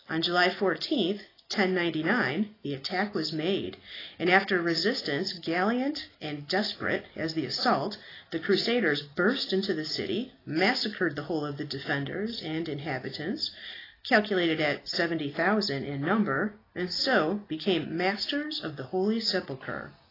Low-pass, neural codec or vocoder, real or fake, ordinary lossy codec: 5.4 kHz; none; real; AAC, 24 kbps